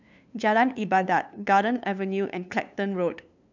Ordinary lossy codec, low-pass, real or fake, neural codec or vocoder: none; 7.2 kHz; fake; codec, 16 kHz, 2 kbps, FunCodec, trained on LibriTTS, 25 frames a second